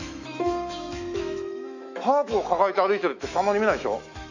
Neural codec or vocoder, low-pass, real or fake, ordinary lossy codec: autoencoder, 48 kHz, 128 numbers a frame, DAC-VAE, trained on Japanese speech; 7.2 kHz; fake; none